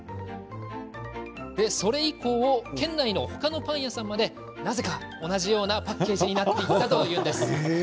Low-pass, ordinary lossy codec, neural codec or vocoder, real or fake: none; none; none; real